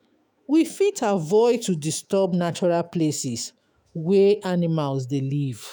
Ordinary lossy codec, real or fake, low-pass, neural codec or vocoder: none; fake; none; autoencoder, 48 kHz, 128 numbers a frame, DAC-VAE, trained on Japanese speech